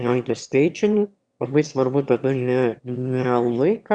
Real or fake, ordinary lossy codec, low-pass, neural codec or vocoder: fake; Opus, 64 kbps; 9.9 kHz; autoencoder, 22.05 kHz, a latent of 192 numbers a frame, VITS, trained on one speaker